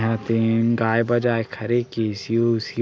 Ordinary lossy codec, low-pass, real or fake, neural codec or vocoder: none; none; real; none